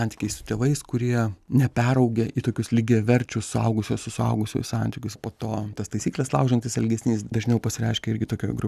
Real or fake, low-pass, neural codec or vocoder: real; 14.4 kHz; none